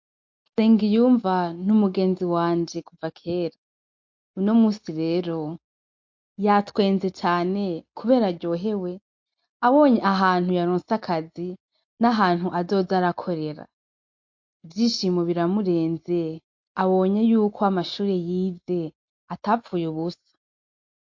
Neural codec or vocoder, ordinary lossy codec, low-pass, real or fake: none; MP3, 48 kbps; 7.2 kHz; real